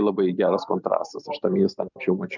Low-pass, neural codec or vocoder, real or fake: 7.2 kHz; none; real